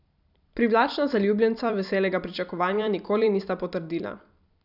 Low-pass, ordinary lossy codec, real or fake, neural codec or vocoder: 5.4 kHz; none; fake; vocoder, 44.1 kHz, 128 mel bands every 256 samples, BigVGAN v2